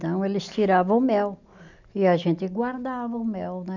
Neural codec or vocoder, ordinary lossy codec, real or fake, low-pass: none; none; real; 7.2 kHz